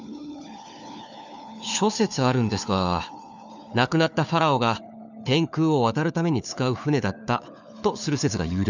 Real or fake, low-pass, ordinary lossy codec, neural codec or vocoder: fake; 7.2 kHz; none; codec, 16 kHz, 4 kbps, FunCodec, trained on LibriTTS, 50 frames a second